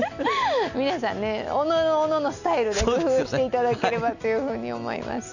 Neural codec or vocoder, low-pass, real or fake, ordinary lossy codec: none; 7.2 kHz; real; none